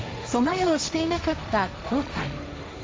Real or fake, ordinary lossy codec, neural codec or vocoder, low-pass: fake; none; codec, 16 kHz, 1.1 kbps, Voila-Tokenizer; none